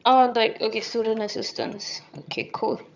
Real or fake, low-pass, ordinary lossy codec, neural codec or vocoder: fake; 7.2 kHz; none; vocoder, 22.05 kHz, 80 mel bands, HiFi-GAN